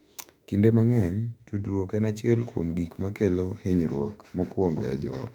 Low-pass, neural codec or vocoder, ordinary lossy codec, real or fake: 19.8 kHz; autoencoder, 48 kHz, 32 numbers a frame, DAC-VAE, trained on Japanese speech; none; fake